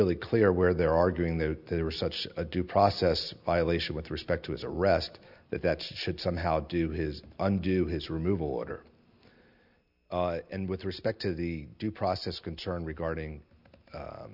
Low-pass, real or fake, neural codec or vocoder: 5.4 kHz; real; none